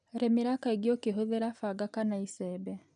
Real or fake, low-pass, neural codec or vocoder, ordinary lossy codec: real; 9.9 kHz; none; none